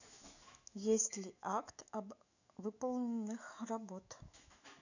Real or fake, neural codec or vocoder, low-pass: fake; autoencoder, 48 kHz, 128 numbers a frame, DAC-VAE, trained on Japanese speech; 7.2 kHz